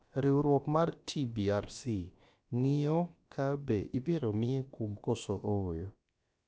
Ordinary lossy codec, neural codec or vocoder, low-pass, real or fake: none; codec, 16 kHz, about 1 kbps, DyCAST, with the encoder's durations; none; fake